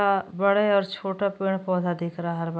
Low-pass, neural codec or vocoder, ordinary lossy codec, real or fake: none; none; none; real